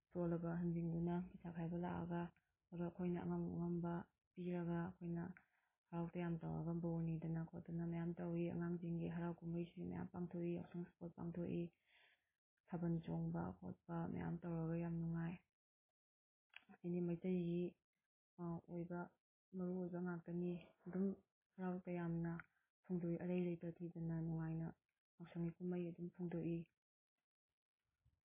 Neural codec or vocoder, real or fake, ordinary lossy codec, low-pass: none; real; MP3, 16 kbps; 3.6 kHz